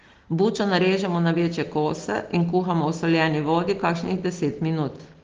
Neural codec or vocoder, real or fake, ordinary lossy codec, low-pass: none; real; Opus, 16 kbps; 7.2 kHz